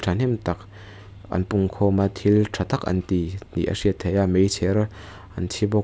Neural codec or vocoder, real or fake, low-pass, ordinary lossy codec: none; real; none; none